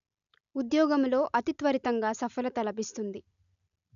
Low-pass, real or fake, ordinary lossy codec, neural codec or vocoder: 7.2 kHz; real; none; none